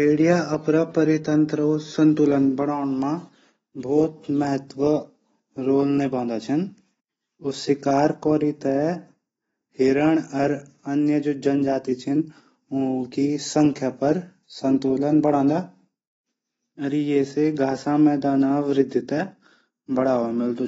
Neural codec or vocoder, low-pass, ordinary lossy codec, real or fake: none; 9.9 kHz; AAC, 24 kbps; real